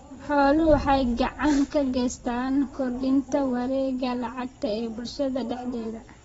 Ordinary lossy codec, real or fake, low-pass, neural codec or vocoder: AAC, 24 kbps; fake; 9.9 kHz; vocoder, 22.05 kHz, 80 mel bands, Vocos